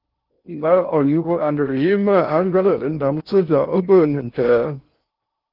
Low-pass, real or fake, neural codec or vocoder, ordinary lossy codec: 5.4 kHz; fake; codec, 16 kHz in and 24 kHz out, 0.6 kbps, FocalCodec, streaming, 4096 codes; Opus, 24 kbps